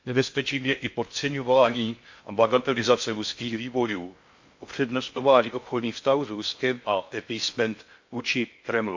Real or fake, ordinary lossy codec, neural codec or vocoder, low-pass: fake; MP3, 48 kbps; codec, 16 kHz in and 24 kHz out, 0.6 kbps, FocalCodec, streaming, 2048 codes; 7.2 kHz